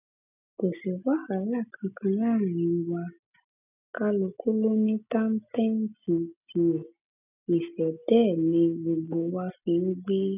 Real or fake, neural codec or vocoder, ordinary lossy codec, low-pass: real; none; none; 3.6 kHz